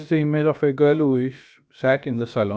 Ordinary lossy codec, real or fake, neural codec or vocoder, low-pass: none; fake; codec, 16 kHz, about 1 kbps, DyCAST, with the encoder's durations; none